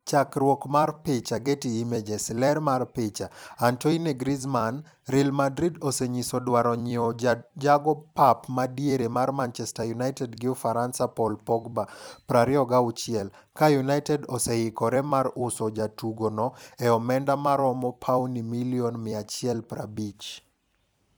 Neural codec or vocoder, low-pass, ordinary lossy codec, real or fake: vocoder, 44.1 kHz, 128 mel bands every 256 samples, BigVGAN v2; none; none; fake